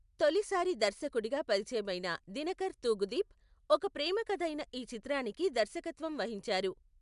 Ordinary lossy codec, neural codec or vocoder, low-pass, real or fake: MP3, 96 kbps; none; 10.8 kHz; real